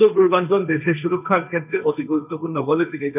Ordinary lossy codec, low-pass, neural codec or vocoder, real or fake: none; 3.6 kHz; codec, 16 kHz, 1.1 kbps, Voila-Tokenizer; fake